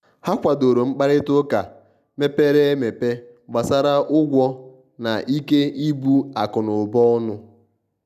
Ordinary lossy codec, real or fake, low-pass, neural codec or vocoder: none; real; 14.4 kHz; none